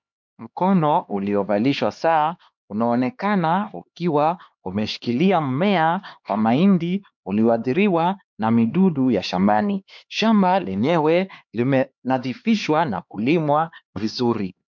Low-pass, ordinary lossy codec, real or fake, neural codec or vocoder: 7.2 kHz; MP3, 64 kbps; fake; codec, 16 kHz, 2 kbps, X-Codec, HuBERT features, trained on LibriSpeech